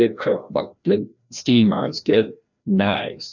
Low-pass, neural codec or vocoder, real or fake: 7.2 kHz; codec, 16 kHz, 1 kbps, FreqCodec, larger model; fake